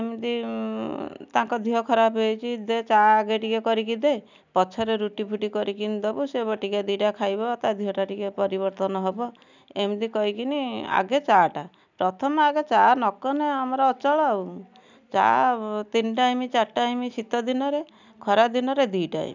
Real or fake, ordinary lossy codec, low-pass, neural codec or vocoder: real; none; 7.2 kHz; none